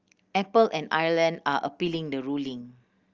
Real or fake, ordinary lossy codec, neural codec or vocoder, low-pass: real; Opus, 24 kbps; none; 7.2 kHz